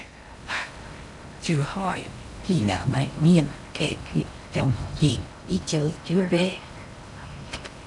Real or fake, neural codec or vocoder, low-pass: fake; codec, 16 kHz in and 24 kHz out, 0.6 kbps, FocalCodec, streaming, 2048 codes; 10.8 kHz